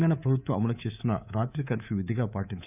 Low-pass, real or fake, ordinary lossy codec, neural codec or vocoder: 3.6 kHz; fake; none; codec, 24 kHz, 3.1 kbps, DualCodec